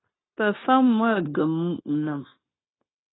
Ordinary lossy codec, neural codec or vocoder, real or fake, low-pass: AAC, 16 kbps; codec, 16 kHz, 0.9 kbps, LongCat-Audio-Codec; fake; 7.2 kHz